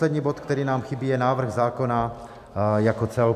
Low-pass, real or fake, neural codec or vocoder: 14.4 kHz; real; none